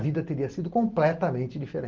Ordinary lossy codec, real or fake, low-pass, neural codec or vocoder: Opus, 32 kbps; real; 7.2 kHz; none